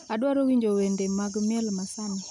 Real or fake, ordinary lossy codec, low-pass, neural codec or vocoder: real; none; 10.8 kHz; none